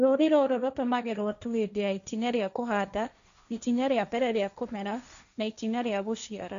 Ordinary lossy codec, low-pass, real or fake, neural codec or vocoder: none; 7.2 kHz; fake; codec, 16 kHz, 1.1 kbps, Voila-Tokenizer